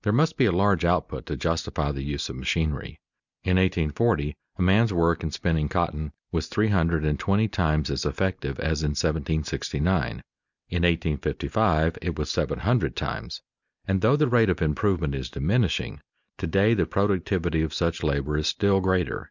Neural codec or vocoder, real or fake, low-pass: none; real; 7.2 kHz